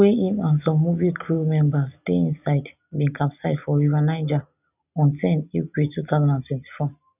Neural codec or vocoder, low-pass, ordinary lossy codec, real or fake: none; 3.6 kHz; none; real